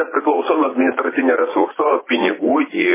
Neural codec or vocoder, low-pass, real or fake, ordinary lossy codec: vocoder, 44.1 kHz, 80 mel bands, Vocos; 3.6 kHz; fake; MP3, 16 kbps